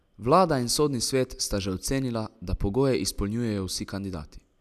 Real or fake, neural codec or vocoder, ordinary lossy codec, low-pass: real; none; none; 14.4 kHz